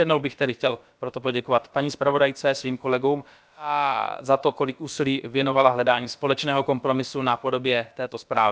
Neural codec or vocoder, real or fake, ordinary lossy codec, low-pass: codec, 16 kHz, about 1 kbps, DyCAST, with the encoder's durations; fake; none; none